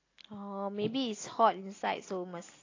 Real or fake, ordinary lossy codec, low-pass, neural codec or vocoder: real; AAC, 32 kbps; 7.2 kHz; none